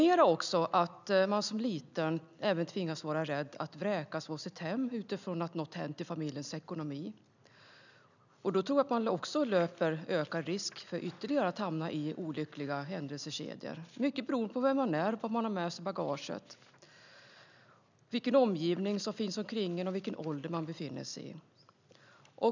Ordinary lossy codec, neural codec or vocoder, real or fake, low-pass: none; none; real; 7.2 kHz